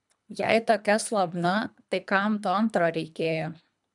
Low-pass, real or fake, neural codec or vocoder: 10.8 kHz; fake; codec, 24 kHz, 3 kbps, HILCodec